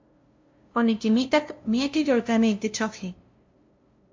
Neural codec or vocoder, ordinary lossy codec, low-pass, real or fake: codec, 16 kHz, 0.5 kbps, FunCodec, trained on LibriTTS, 25 frames a second; MP3, 48 kbps; 7.2 kHz; fake